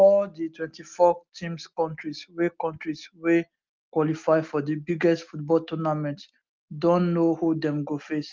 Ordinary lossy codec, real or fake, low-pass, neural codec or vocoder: Opus, 24 kbps; real; 7.2 kHz; none